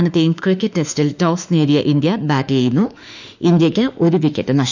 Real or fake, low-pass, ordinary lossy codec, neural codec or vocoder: fake; 7.2 kHz; none; autoencoder, 48 kHz, 32 numbers a frame, DAC-VAE, trained on Japanese speech